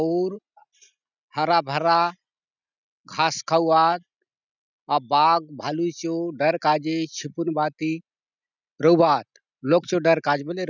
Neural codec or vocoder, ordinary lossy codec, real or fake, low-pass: none; none; real; 7.2 kHz